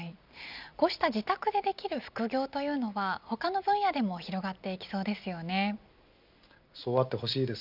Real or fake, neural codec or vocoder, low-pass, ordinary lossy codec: real; none; 5.4 kHz; none